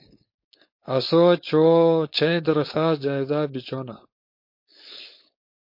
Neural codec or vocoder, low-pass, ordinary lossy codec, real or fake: codec, 16 kHz, 4.8 kbps, FACodec; 5.4 kHz; MP3, 32 kbps; fake